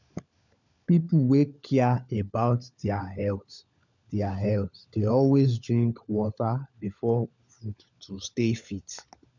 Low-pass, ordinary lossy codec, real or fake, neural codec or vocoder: 7.2 kHz; none; fake; codec, 16 kHz, 16 kbps, FunCodec, trained on LibriTTS, 50 frames a second